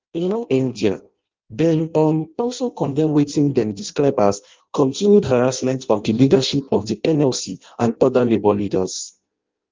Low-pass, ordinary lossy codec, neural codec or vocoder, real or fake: 7.2 kHz; Opus, 32 kbps; codec, 16 kHz in and 24 kHz out, 0.6 kbps, FireRedTTS-2 codec; fake